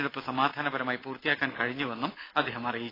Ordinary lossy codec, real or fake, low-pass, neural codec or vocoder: AAC, 24 kbps; real; 5.4 kHz; none